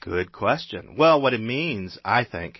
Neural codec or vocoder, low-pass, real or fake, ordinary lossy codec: none; 7.2 kHz; real; MP3, 24 kbps